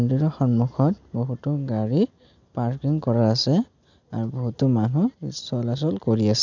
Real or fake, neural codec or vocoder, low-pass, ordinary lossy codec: real; none; 7.2 kHz; none